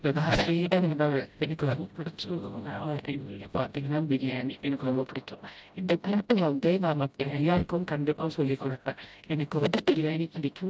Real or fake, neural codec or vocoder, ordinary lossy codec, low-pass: fake; codec, 16 kHz, 0.5 kbps, FreqCodec, smaller model; none; none